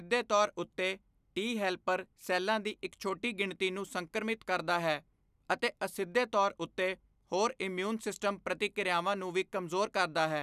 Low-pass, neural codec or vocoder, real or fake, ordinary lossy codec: 14.4 kHz; none; real; none